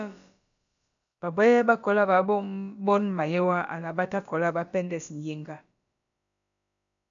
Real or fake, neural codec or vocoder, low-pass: fake; codec, 16 kHz, about 1 kbps, DyCAST, with the encoder's durations; 7.2 kHz